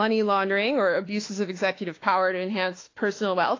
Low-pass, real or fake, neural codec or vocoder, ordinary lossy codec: 7.2 kHz; fake; autoencoder, 48 kHz, 32 numbers a frame, DAC-VAE, trained on Japanese speech; AAC, 32 kbps